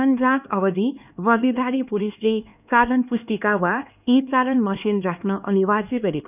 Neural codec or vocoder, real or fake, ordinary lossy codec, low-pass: codec, 16 kHz, 2 kbps, X-Codec, HuBERT features, trained on LibriSpeech; fake; none; 3.6 kHz